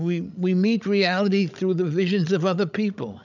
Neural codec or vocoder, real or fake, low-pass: codec, 16 kHz, 16 kbps, FreqCodec, larger model; fake; 7.2 kHz